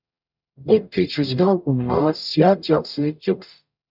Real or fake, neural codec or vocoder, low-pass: fake; codec, 44.1 kHz, 0.9 kbps, DAC; 5.4 kHz